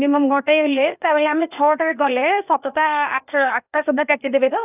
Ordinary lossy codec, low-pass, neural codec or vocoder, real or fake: none; 3.6 kHz; codec, 16 kHz, 0.8 kbps, ZipCodec; fake